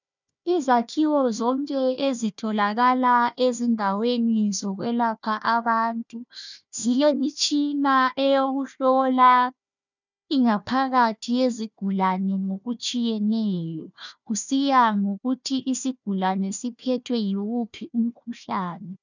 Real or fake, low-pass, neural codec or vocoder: fake; 7.2 kHz; codec, 16 kHz, 1 kbps, FunCodec, trained on Chinese and English, 50 frames a second